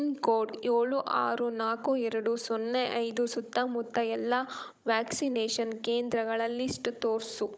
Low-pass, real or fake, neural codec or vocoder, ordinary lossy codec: none; fake; codec, 16 kHz, 16 kbps, FunCodec, trained on Chinese and English, 50 frames a second; none